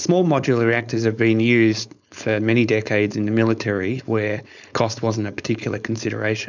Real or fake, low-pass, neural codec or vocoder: fake; 7.2 kHz; codec, 16 kHz, 4.8 kbps, FACodec